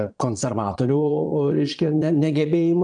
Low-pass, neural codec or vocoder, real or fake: 9.9 kHz; vocoder, 22.05 kHz, 80 mel bands, Vocos; fake